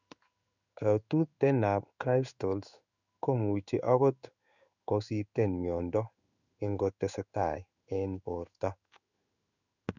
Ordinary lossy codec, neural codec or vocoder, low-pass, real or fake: none; codec, 16 kHz in and 24 kHz out, 1 kbps, XY-Tokenizer; 7.2 kHz; fake